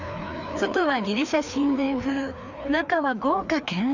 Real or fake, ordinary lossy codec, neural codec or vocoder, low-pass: fake; none; codec, 16 kHz, 2 kbps, FreqCodec, larger model; 7.2 kHz